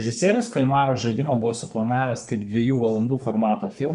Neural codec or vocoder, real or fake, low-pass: codec, 24 kHz, 1 kbps, SNAC; fake; 10.8 kHz